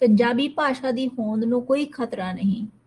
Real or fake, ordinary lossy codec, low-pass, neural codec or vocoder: real; Opus, 24 kbps; 10.8 kHz; none